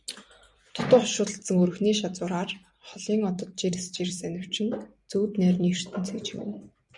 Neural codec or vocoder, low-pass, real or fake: vocoder, 44.1 kHz, 128 mel bands every 256 samples, BigVGAN v2; 10.8 kHz; fake